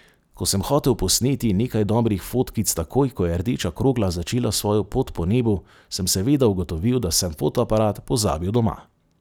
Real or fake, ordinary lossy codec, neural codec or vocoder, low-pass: real; none; none; none